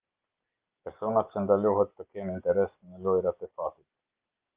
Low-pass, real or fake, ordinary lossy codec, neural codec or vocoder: 3.6 kHz; real; Opus, 32 kbps; none